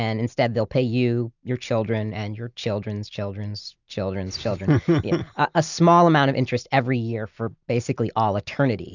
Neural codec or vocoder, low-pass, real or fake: none; 7.2 kHz; real